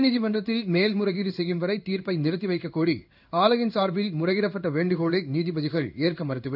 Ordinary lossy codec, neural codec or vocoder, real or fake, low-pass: none; codec, 16 kHz in and 24 kHz out, 1 kbps, XY-Tokenizer; fake; 5.4 kHz